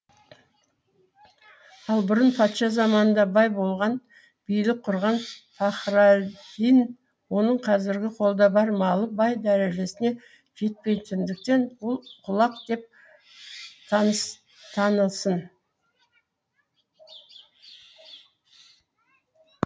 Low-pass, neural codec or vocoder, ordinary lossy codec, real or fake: none; none; none; real